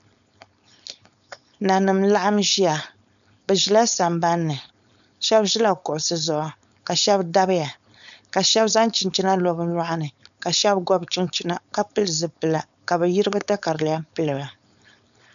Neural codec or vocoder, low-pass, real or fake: codec, 16 kHz, 4.8 kbps, FACodec; 7.2 kHz; fake